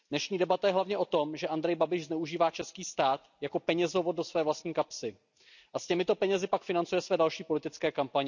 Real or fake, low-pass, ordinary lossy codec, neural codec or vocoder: real; 7.2 kHz; none; none